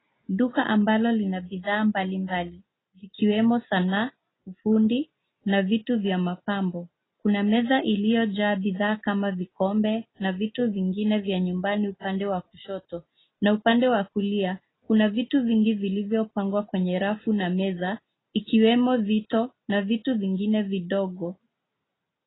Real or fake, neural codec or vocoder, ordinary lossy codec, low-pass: real; none; AAC, 16 kbps; 7.2 kHz